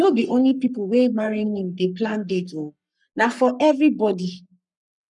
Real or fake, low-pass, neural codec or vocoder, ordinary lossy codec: fake; 10.8 kHz; codec, 44.1 kHz, 3.4 kbps, Pupu-Codec; none